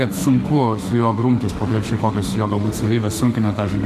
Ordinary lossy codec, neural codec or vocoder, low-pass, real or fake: AAC, 96 kbps; autoencoder, 48 kHz, 32 numbers a frame, DAC-VAE, trained on Japanese speech; 14.4 kHz; fake